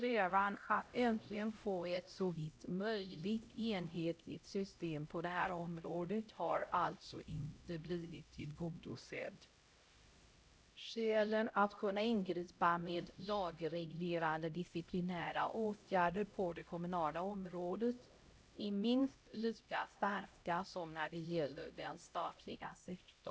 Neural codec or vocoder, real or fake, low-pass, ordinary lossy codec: codec, 16 kHz, 0.5 kbps, X-Codec, HuBERT features, trained on LibriSpeech; fake; none; none